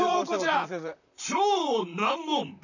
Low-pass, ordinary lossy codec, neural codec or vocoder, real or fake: 7.2 kHz; none; vocoder, 44.1 kHz, 128 mel bands, Pupu-Vocoder; fake